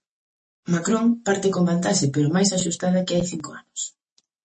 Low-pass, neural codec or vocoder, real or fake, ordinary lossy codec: 10.8 kHz; none; real; MP3, 32 kbps